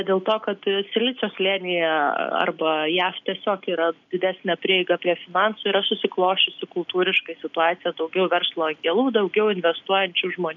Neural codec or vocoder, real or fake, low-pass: none; real; 7.2 kHz